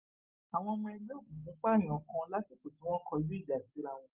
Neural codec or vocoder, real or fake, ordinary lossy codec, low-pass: none; real; Opus, 32 kbps; 3.6 kHz